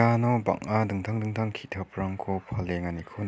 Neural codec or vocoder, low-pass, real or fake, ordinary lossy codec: none; none; real; none